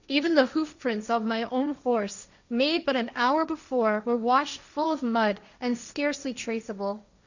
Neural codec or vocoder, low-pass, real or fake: codec, 16 kHz, 1.1 kbps, Voila-Tokenizer; 7.2 kHz; fake